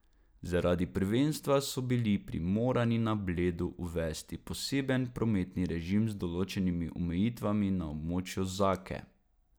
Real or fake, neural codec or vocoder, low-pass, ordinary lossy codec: real; none; none; none